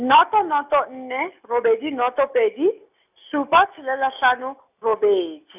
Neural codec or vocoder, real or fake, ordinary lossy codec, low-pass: none; real; none; 3.6 kHz